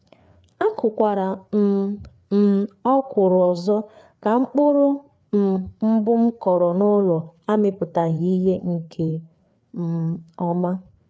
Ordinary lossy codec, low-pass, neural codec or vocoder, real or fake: none; none; codec, 16 kHz, 4 kbps, FreqCodec, larger model; fake